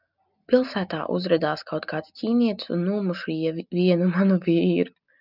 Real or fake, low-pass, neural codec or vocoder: real; 5.4 kHz; none